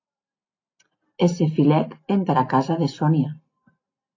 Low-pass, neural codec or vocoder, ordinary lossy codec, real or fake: 7.2 kHz; none; AAC, 32 kbps; real